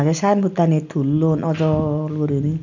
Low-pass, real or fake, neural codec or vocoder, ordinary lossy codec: 7.2 kHz; real; none; none